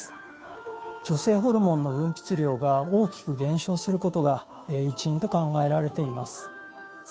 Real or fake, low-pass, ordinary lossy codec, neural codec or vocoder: fake; none; none; codec, 16 kHz, 2 kbps, FunCodec, trained on Chinese and English, 25 frames a second